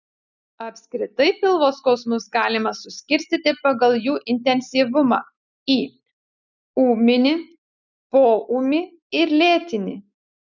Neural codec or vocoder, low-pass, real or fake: none; 7.2 kHz; real